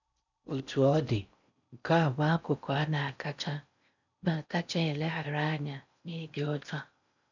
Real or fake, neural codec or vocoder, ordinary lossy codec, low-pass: fake; codec, 16 kHz in and 24 kHz out, 0.6 kbps, FocalCodec, streaming, 4096 codes; none; 7.2 kHz